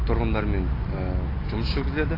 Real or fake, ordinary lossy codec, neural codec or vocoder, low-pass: real; AAC, 24 kbps; none; 5.4 kHz